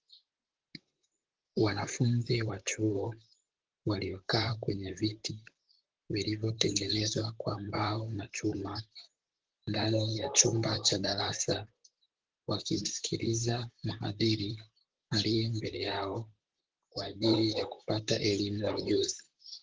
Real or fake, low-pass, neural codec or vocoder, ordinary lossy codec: fake; 7.2 kHz; vocoder, 44.1 kHz, 128 mel bands, Pupu-Vocoder; Opus, 16 kbps